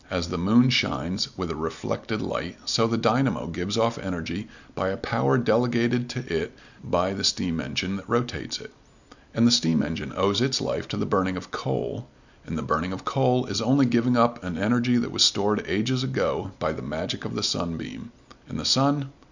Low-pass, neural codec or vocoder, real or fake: 7.2 kHz; none; real